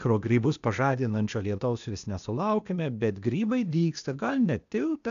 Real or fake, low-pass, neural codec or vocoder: fake; 7.2 kHz; codec, 16 kHz, 0.8 kbps, ZipCodec